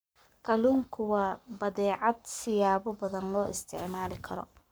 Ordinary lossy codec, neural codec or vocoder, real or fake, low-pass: none; codec, 44.1 kHz, 7.8 kbps, Pupu-Codec; fake; none